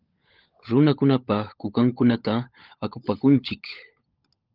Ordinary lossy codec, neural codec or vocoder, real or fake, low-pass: Opus, 32 kbps; codec, 16 kHz, 16 kbps, FunCodec, trained on LibriTTS, 50 frames a second; fake; 5.4 kHz